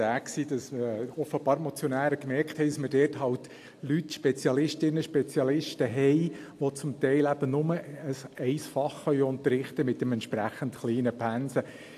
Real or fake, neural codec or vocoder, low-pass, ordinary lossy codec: real; none; 14.4 kHz; AAC, 64 kbps